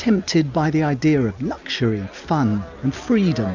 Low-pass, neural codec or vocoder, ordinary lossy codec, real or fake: 7.2 kHz; none; MP3, 64 kbps; real